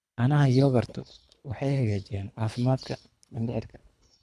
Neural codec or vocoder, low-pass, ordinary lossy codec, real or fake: codec, 24 kHz, 3 kbps, HILCodec; none; none; fake